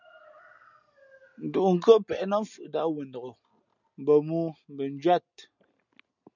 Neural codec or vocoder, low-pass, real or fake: none; 7.2 kHz; real